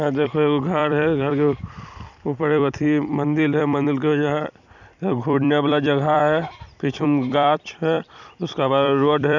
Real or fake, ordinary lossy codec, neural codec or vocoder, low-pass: fake; none; vocoder, 44.1 kHz, 128 mel bands every 256 samples, BigVGAN v2; 7.2 kHz